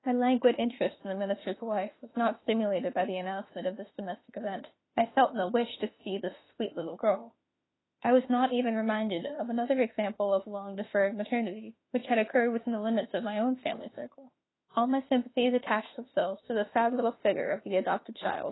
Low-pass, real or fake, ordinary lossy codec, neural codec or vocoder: 7.2 kHz; fake; AAC, 16 kbps; autoencoder, 48 kHz, 32 numbers a frame, DAC-VAE, trained on Japanese speech